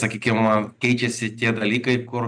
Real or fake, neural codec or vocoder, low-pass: real; none; 9.9 kHz